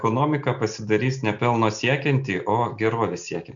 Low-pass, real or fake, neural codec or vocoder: 7.2 kHz; real; none